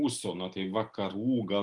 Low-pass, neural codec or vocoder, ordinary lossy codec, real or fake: 10.8 kHz; none; Opus, 32 kbps; real